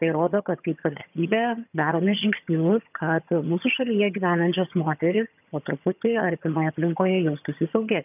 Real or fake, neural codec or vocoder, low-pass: fake; vocoder, 22.05 kHz, 80 mel bands, HiFi-GAN; 3.6 kHz